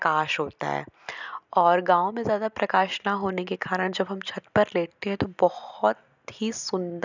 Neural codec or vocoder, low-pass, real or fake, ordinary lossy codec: none; 7.2 kHz; real; none